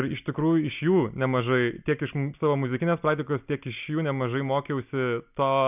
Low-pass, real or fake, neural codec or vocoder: 3.6 kHz; real; none